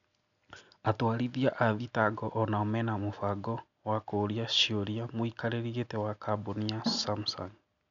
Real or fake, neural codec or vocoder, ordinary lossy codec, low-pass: real; none; none; 7.2 kHz